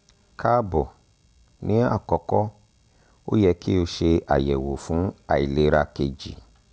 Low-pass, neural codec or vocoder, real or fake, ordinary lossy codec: none; none; real; none